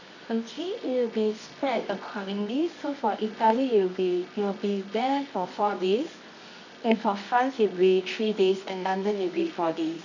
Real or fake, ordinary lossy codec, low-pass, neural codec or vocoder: fake; none; 7.2 kHz; codec, 24 kHz, 0.9 kbps, WavTokenizer, medium music audio release